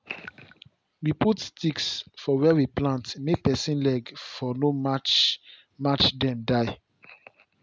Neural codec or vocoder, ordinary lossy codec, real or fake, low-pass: none; none; real; none